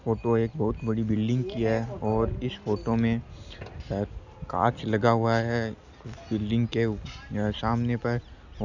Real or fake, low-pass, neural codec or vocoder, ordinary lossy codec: real; 7.2 kHz; none; none